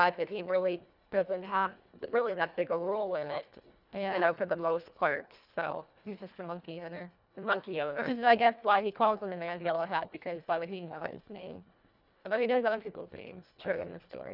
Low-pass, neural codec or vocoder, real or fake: 5.4 kHz; codec, 24 kHz, 1.5 kbps, HILCodec; fake